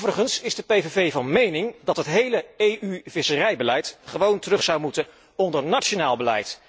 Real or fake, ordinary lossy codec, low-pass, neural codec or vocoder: real; none; none; none